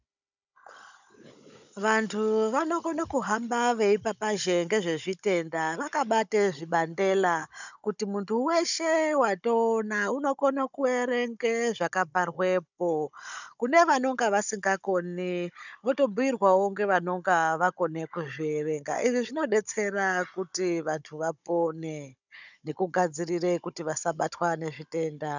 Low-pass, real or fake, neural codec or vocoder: 7.2 kHz; fake; codec, 16 kHz, 16 kbps, FunCodec, trained on Chinese and English, 50 frames a second